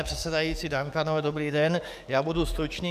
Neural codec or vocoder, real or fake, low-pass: codec, 44.1 kHz, 7.8 kbps, DAC; fake; 14.4 kHz